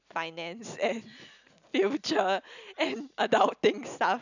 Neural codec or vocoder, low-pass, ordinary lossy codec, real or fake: none; 7.2 kHz; none; real